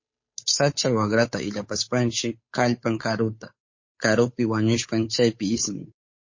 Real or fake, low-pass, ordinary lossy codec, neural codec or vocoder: fake; 7.2 kHz; MP3, 32 kbps; codec, 16 kHz, 8 kbps, FunCodec, trained on Chinese and English, 25 frames a second